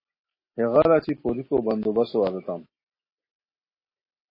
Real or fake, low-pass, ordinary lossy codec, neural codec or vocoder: real; 5.4 kHz; MP3, 24 kbps; none